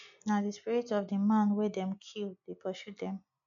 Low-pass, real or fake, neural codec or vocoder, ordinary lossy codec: 7.2 kHz; real; none; none